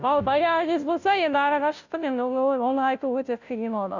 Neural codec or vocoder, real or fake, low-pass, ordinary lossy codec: codec, 16 kHz, 0.5 kbps, FunCodec, trained on Chinese and English, 25 frames a second; fake; 7.2 kHz; none